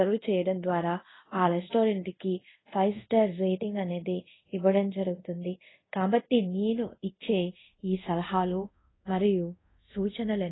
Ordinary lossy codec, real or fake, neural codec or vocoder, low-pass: AAC, 16 kbps; fake; codec, 24 kHz, 0.5 kbps, DualCodec; 7.2 kHz